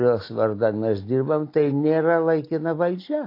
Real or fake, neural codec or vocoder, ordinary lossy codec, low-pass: real; none; AAC, 32 kbps; 5.4 kHz